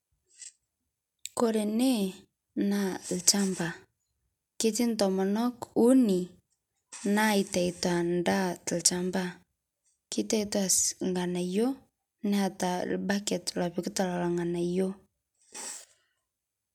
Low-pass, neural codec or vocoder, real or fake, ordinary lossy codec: 19.8 kHz; none; real; none